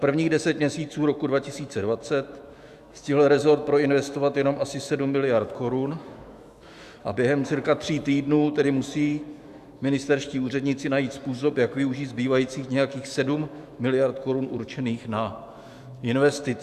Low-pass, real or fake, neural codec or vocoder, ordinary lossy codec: 14.4 kHz; fake; autoencoder, 48 kHz, 128 numbers a frame, DAC-VAE, trained on Japanese speech; Opus, 64 kbps